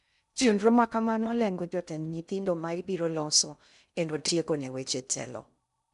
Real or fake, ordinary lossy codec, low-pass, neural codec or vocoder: fake; none; 10.8 kHz; codec, 16 kHz in and 24 kHz out, 0.6 kbps, FocalCodec, streaming, 2048 codes